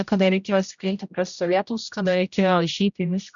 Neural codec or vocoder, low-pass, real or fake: codec, 16 kHz, 0.5 kbps, X-Codec, HuBERT features, trained on general audio; 7.2 kHz; fake